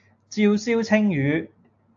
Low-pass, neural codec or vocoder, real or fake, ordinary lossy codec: 7.2 kHz; none; real; AAC, 64 kbps